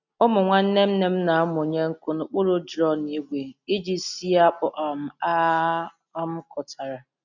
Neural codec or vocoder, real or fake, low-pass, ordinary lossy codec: none; real; 7.2 kHz; none